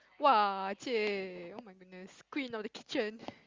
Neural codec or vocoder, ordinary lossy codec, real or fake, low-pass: none; Opus, 32 kbps; real; 7.2 kHz